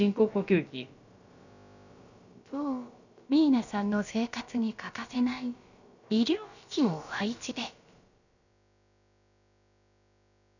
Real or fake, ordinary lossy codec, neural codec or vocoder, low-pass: fake; none; codec, 16 kHz, about 1 kbps, DyCAST, with the encoder's durations; 7.2 kHz